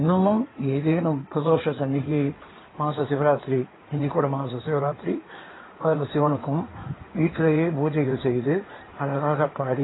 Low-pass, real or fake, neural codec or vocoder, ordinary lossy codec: 7.2 kHz; fake; codec, 16 kHz in and 24 kHz out, 2.2 kbps, FireRedTTS-2 codec; AAC, 16 kbps